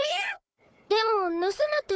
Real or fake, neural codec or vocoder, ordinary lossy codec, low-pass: fake; codec, 16 kHz, 4 kbps, FunCodec, trained on Chinese and English, 50 frames a second; none; none